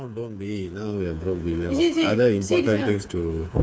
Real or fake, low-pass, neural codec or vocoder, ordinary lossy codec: fake; none; codec, 16 kHz, 4 kbps, FreqCodec, smaller model; none